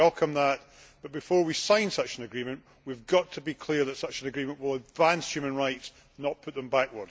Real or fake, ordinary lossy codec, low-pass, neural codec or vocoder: real; none; none; none